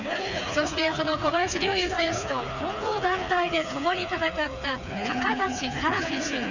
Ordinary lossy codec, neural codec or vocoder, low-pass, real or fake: none; codec, 16 kHz, 4 kbps, FreqCodec, smaller model; 7.2 kHz; fake